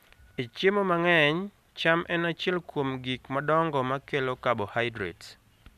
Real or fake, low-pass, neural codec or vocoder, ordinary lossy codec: real; 14.4 kHz; none; none